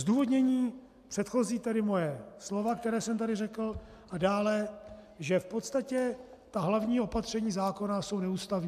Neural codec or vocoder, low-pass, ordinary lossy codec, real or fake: none; 14.4 kHz; AAC, 96 kbps; real